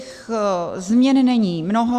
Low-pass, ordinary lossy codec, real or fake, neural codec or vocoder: 14.4 kHz; Opus, 64 kbps; real; none